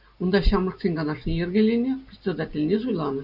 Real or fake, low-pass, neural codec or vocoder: real; 5.4 kHz; none